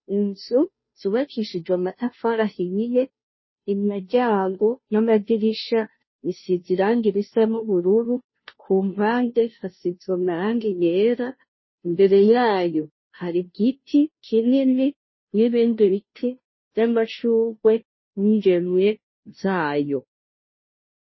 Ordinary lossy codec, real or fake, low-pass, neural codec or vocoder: MP3, 24 kbps; fake; 7.2 kHz; codec, 16 kHz, 0.5 kbps, FunCodec, trained on Chinese and English, 25 frames a second